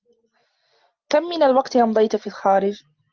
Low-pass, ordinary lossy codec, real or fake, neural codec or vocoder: 7.2 kHz; Opus, 24 kbps; real; none